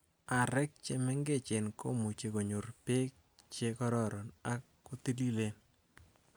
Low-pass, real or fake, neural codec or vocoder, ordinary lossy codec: none; real; none; none